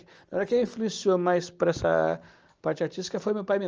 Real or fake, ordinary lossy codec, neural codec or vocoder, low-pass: real; Opus, 24 kbps; none; 7.2 kHz